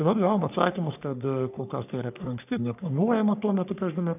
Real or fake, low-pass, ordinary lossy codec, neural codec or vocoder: fake; 3.6 kHz; AAC, 32 kbps; codec, 44.1 kHz, 3.4 kbps, Pupu-Codec